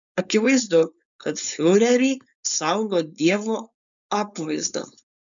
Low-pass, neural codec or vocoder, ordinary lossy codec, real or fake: 7.2 kHz; codec, 16 kHz, 4.8 kbps, FACodec; MP3, 96 kbps; fake